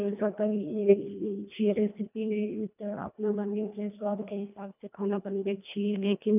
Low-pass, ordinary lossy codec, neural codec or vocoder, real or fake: 3.6 kHz; none; codec, 24 kHz, 1.5 kbps, HILCodec; fake